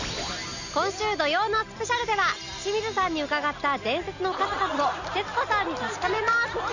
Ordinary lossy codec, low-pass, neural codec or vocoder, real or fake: none; 7.2 kHz; none; real